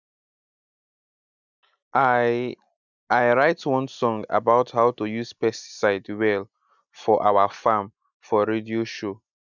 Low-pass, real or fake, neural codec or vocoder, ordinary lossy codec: 7.2 kHz; real; none; none